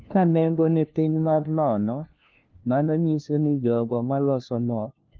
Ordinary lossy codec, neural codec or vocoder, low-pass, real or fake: Opus, 24 kbps; codec, 16 kHz, 1 kbps, FunCodec, trained on LibriTTS, 50 frames a second; 7.2 kHz; fake